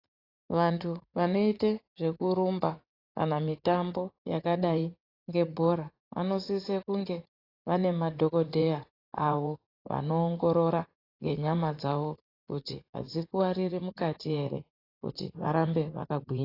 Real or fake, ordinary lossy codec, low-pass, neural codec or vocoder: fake; AAC, 24 kbps; 5.4 kHz; vocoder, 44.1 kHz, 80 mel bands, Vocos